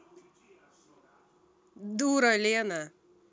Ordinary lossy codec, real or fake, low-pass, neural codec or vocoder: none; real; none; none